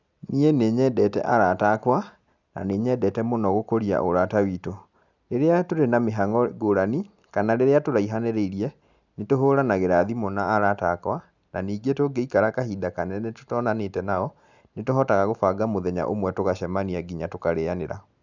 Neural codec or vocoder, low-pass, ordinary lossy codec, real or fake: none; 7.2 kHz; none; real